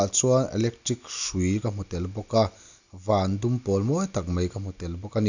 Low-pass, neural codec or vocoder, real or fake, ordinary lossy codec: 7.2 kHz; none; real; none